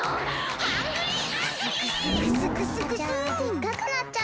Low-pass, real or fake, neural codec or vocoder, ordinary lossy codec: none; real; none; none